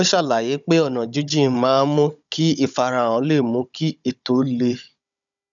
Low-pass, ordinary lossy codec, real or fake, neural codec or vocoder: 7.2 kHz; none; fake; codec, 16 kHz, 16 kbps, FunCodec, trained on Chinese and English, 50 frames a second